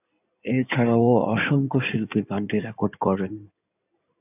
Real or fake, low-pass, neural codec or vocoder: fake; 3.6 kHz; codec, 16 kHz in and 24 kHz out, 2.2 kbps, FireRedTTS-2 codec